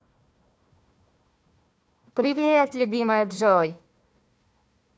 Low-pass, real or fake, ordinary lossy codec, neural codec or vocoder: none; fake; none; codec, 16 kHz, 1 kbps, FunCodec, trained on Chinese and English, 50 frames a second